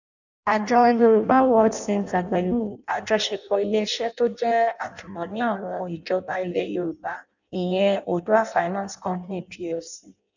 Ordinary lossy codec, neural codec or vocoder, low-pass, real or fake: none; codec, 16 kHz in and 24 kHz out, 0.6 kbps, FireRedTTS-2 codec; 7.2 kHz; fake